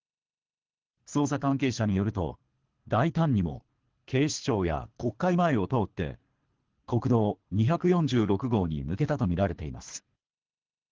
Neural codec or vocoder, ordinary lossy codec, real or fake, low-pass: codec, 24 kHz, 3 kbps, HILCodec; Opus, 16 kbps; fake; 7.2 kHz